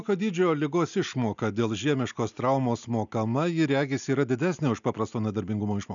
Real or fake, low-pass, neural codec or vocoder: real; 7.2 kHz; none